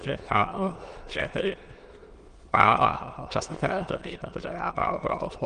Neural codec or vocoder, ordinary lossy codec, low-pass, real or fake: autoencoder, 22.05 kHz, a latent of 192 numbers a frame, VITS, trained on many speakers; Opus, 24 kbps; 9.9 kHz; fake